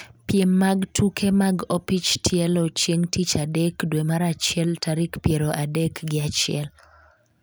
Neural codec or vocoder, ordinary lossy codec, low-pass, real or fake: none; none; none; real